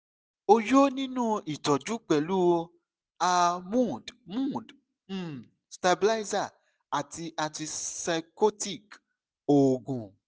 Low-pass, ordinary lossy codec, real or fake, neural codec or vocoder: none; none; real; none